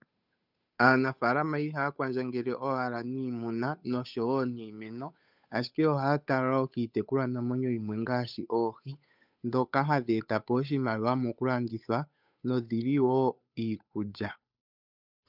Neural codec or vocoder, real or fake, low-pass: codec, 16 kHz, 8 kbps, FunCodec, trained on Chinese and English, 25 frames a second; fake; 5.4 kHz